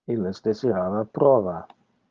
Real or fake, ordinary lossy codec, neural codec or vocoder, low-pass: real; Opus, 24 kbps; none; 7.2 kHz